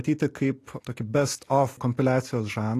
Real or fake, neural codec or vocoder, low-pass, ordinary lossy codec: real; none; 14.4 kHz; AAC, 48 kbps